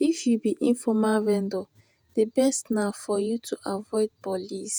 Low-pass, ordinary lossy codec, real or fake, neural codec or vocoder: none; none; fake; vocoder, 48 kHz, 128 mel bands, Vocos